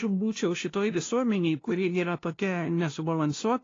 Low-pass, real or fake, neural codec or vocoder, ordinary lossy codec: 7.2 kHz; fake; codec, 16 kHz, 0.5 kbps, FunCodec, trained on LibriTTS, 25 frames a second; AAC, 32 kbps